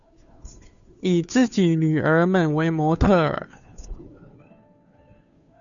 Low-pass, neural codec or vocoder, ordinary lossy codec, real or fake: 7.2 kHz; codec, 16 kHz, 2 kbps, FunCodec, trained on Chinese and English, 25 frames a second; MP3, 96 kbps; fake